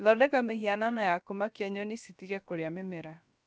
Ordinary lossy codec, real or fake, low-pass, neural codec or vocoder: none; fake; none; codec, 16 kHz, about 1 kbps, DyCAST, with the encoder's durations